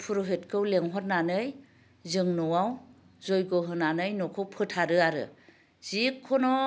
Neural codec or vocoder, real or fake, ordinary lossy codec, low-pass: none; real; none; none